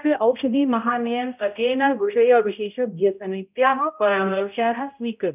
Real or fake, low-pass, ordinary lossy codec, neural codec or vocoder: fake; 3.6 kHz; none; codec, 16 kHz, 0.5 kbps, X-Codec, HuBERT features, trained on balanced general audio